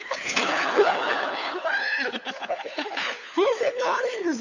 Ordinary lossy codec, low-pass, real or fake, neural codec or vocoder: none; 7.2 kHz; fake; codec, 16 kHz, 4 kbps, FunCodec, trained on LibriTTS, 50 frames a second